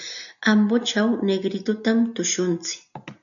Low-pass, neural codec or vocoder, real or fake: 7.2 kHz; none; real